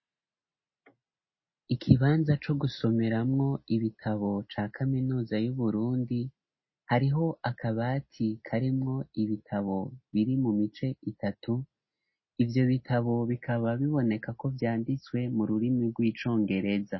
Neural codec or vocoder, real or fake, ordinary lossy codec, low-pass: none; real; MP3, 24 kbps; 7.2 kHz